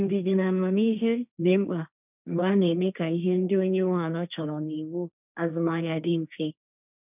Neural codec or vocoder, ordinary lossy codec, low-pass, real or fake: codec, 16 kHz, 1.1 kbps, Voila-Tokenizer; none; 3.6 kHz; fake